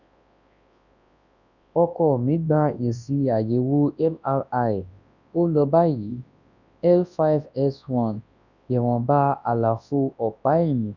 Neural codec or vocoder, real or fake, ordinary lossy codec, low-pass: codec, 24 kHz, 0.9 kbps, WavTokenizer, large speech release; fake; none; 7.2 kHz